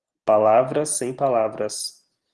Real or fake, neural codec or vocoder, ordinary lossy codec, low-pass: real; none; Opus, 16 kbps; 10.8 kHz